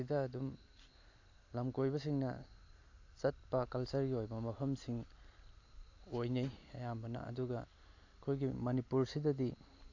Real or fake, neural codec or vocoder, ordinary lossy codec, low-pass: real; none; AAC, 48 kbps; 7.2 kHz